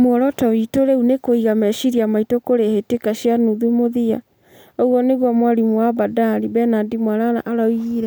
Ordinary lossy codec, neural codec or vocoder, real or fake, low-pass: none; none; real; none